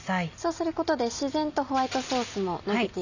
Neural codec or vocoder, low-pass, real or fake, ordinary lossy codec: none; 7.2 kHz; real; none